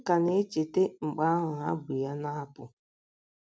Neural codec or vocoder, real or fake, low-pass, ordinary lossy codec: none; real; none; none